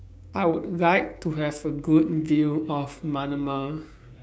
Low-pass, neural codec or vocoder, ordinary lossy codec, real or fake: none; codec, 16 kHz, 6 kbps, DAC; none; fake